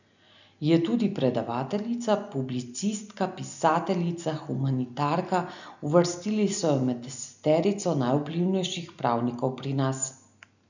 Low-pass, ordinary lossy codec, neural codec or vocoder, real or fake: 7.2 kHz; none; none; real